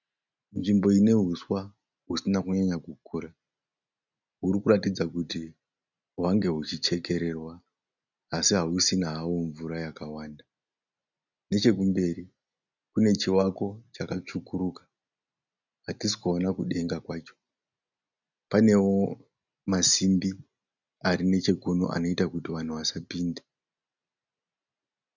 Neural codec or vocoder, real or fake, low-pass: none; real; 7.2 kHz